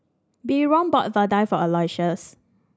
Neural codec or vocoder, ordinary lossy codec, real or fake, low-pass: none; none; real; none